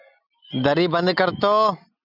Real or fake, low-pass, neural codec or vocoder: real; 5.4 kHz; none